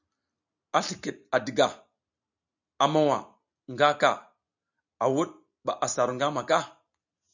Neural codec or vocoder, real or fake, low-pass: none; real; 7.2 kHz